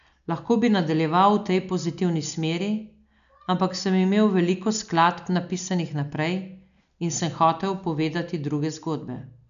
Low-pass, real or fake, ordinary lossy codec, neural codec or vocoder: 7.2 kHz; real; none; none